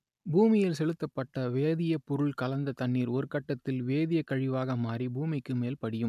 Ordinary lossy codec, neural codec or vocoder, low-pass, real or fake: none; none; 14.4 kHz; real